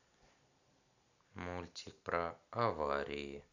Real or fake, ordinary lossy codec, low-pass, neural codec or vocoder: real; none; 7.2 kHz; none